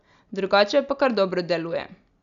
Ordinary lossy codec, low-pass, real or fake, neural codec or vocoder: none; 7.2 kHz; real; none